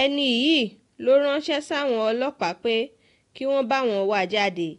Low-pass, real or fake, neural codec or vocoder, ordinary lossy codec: 10.8 kHz; real; none; AAC, 48 kbps